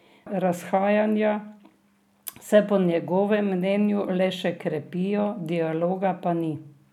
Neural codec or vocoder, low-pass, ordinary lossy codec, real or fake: none; 19.8 kHz; none; real